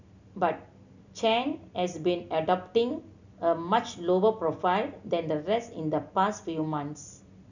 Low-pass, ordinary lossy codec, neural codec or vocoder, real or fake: 7.2 kHz; none; none; real